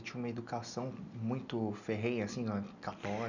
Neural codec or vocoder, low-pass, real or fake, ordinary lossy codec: none; 7.2 kHz; real; none